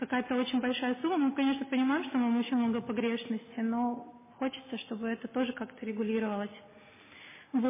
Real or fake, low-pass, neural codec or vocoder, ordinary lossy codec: real; 3.6 kHz; none; MP3, 16 kbps